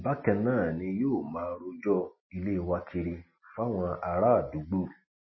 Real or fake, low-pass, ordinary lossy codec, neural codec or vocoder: real; 7.2 kHz; MP3, 24 kbps; none